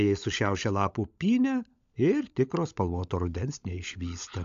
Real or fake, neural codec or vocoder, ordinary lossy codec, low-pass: fake; codec, 16 kHz, 16 kbps, FunCodec, trained on LibriTTS, 50 frames a second; AAC, 64 kbps; 7.2 kHz